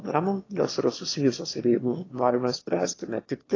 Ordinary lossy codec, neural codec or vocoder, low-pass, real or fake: AAC, 32 kbps; autoencoder, 22.05 kHz, a latent of 192 numbers a frame, VITS, trained on one speaker; 7.2 kHz; fake